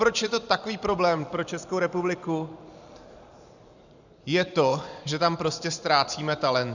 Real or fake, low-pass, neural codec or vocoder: fake; 7.2 kHz; vocoder, 44.1 kHz, 128 mel bands every 512 samples, BigVGAN v2